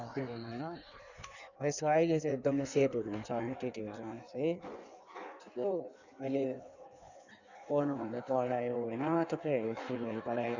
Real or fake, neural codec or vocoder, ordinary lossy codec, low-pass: fake; codec, 16 kHz in and 24 kHz out, 1.1 kbps, FireRedTTS-2 codec; none; 7.2 kHz